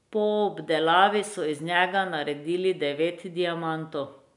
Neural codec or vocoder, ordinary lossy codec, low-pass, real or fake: none; none; 10.8 kHz; real